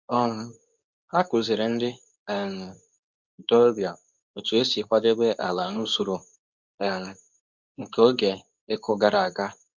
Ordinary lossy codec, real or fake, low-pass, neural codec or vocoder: none; fake; 7.2 kHz; codec, 24 kHz, 0.9 kbps, WavTokenizer, medium speech release version 2